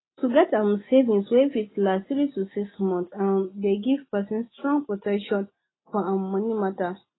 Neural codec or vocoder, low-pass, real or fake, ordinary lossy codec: none; 7.2 kHz; real; AAC, 16 kbps